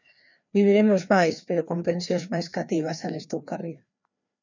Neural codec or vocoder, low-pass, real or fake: codec, 16 kHz, 2 kbps, FreqCodec, larger model; 7.2 kHz; fake